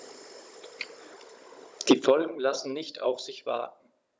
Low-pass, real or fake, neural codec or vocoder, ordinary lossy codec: none; fake; codec, 16 kHz, 16 kbps, FunCodec, trained on Chinese and English, 50 frames a second; none